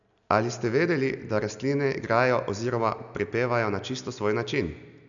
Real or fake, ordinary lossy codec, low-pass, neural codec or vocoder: real; none; 7.2 kHz; none